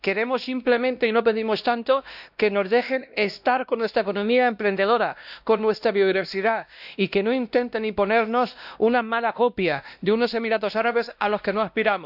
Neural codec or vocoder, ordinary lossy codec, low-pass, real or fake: codec, 16 kHz, 1 kbps, X-Codec, WavLM features, trained on Multilingual LibriSpeech; none; 5.4 kHz; fake